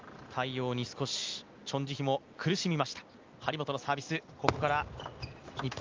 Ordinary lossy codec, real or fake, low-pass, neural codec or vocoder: Opus, 24 kbps; real; 7.2 kHz; none